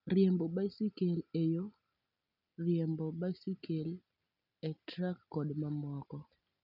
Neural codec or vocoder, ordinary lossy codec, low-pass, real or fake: none; none; 5.4 kHz; real